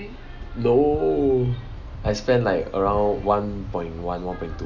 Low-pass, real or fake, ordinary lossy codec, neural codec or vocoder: 7.2 kHz; real; none; none